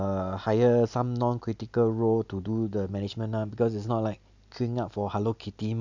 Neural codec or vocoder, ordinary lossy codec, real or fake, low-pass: none; none; real; 7.2 kHz